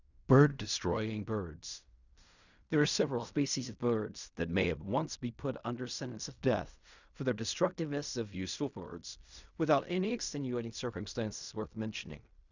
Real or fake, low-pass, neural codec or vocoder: fake; 7.2 kHz; codec, 16 kHz in and 24 kHz out, 0.4 kbps, LongCat-Audio-Codec, fine tuned four codebook decoder